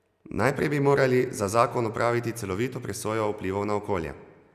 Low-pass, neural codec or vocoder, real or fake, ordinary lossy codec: 14.4 kHz; none; real; none